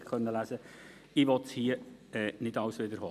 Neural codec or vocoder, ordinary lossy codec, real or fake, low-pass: none; none; real; 14.4 kHz